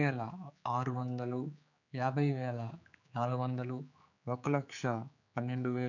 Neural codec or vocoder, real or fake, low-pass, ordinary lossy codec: codec, 16 kHz, 4 kbps, X-Codec, HuBERT features, trained on general audio; fake; 7.2 kHz; none